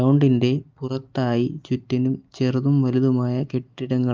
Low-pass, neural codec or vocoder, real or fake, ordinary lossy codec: 7.2 kHz; none; real; Opus, 32 kbps